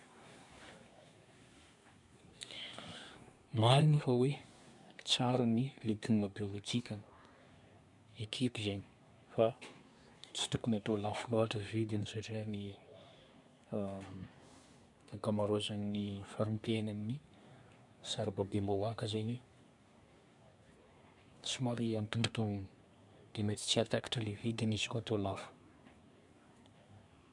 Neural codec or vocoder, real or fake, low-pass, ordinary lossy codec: codec, 24 kHz, 1 kbps, SNAC; fake; 10.8 kHz; none